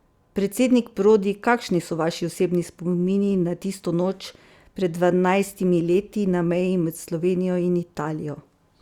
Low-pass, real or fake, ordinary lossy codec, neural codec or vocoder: 19.8 kHz; real; Opus, 64 kbps; none